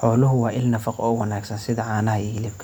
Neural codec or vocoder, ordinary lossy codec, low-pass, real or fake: none; none; none; real